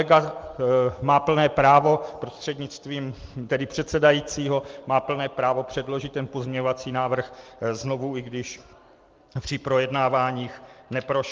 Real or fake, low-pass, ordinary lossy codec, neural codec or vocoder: real; 7.2 kHz; Opus, 32 kbps; none